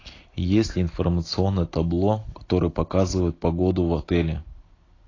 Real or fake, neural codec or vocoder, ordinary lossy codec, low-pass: real; none; AAC, 32 kbps; 7.2 kHz